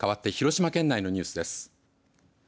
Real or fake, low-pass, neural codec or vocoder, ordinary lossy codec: real; none; none; none